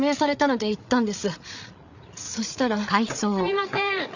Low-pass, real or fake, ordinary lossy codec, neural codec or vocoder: 7.2 kHz; fake; none; codec, 16 kHz, 8 kbps, FreqCodec, larger model